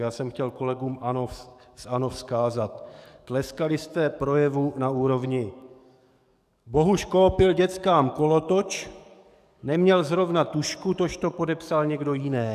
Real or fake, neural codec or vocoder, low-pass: fake; codec, 44.1 kHz, 7.8 kbps, DAC; 14.4 kHz